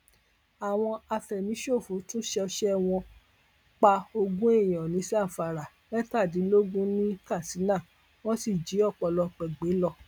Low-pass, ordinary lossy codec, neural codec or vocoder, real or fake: none; none; none; real